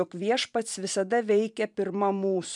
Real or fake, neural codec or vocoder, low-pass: fake; vocoder, 44.1 kHz, 128 mel bands every 512 samples, BigVGAN v2; 10.8 kHz